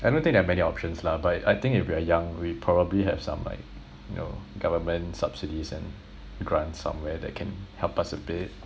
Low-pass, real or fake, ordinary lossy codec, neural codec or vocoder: none; real; none; none